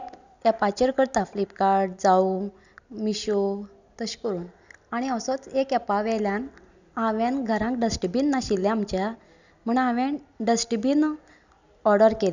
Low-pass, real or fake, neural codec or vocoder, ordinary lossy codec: 7.2 kHz; real; none; none